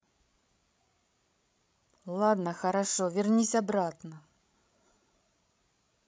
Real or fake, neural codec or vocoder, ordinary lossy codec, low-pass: fake; codec, 16 kHz, 16 kbps, FreqCodec, larger model; none; none